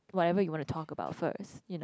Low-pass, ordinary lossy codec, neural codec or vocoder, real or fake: none; none; none; real